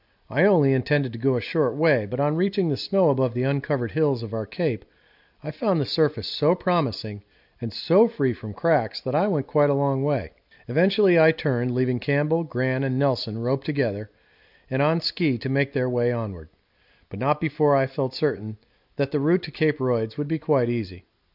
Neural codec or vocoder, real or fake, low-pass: none; real; 5.4 kHz